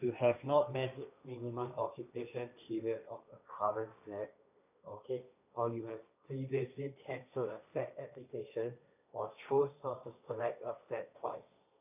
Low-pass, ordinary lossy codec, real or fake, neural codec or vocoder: 3.6 kHz; none; fake; codec, 16 kHz, 1.1 kbps, Voila-Tokenizer